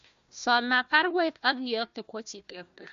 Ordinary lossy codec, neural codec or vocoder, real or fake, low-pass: MP3, 64 kbps; codec, 16 kHz, 1 kbps, FunCodec, trained on Chinese and English, 50 frames a second; fake; 7.2 kHz